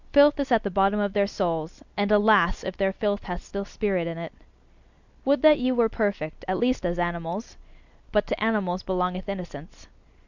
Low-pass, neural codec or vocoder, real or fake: 7.2 kHz; none; real